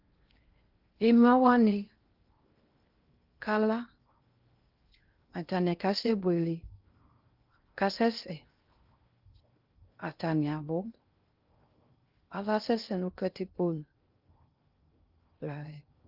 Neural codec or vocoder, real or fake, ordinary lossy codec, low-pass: codec, 16 kHz in and 24 kHz out, 0.6 kbps, FocalCodec, streaming, 2048 codes; fake; Opus, 32 kbps; 5.4 kHz